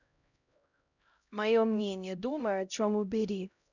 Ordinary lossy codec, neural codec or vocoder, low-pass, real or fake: none; codec, 16 kHz, 0.5 kbps, X-Codec, HuBERT features, trained on LibriSpeech; 7.2 kHz; fake